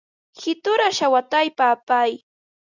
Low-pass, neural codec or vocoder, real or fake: 7.2 kHz; none; real